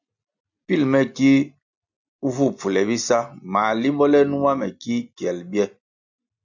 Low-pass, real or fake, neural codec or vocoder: 7.2 kHz; real; none